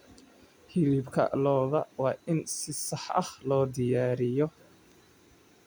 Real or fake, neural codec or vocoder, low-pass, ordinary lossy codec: real; none; none; none